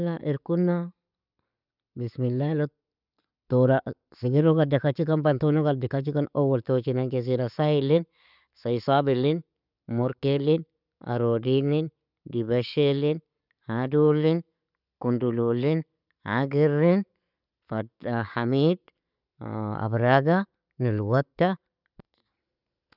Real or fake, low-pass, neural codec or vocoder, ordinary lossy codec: real; 5.4 kHz; none; none